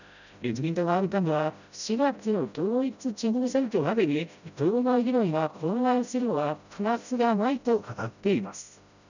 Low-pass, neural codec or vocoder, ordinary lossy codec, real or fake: 7.2 kHz; codec, 16 kHz, 0.5 kbps, FreqCodec, smaller model; none; fake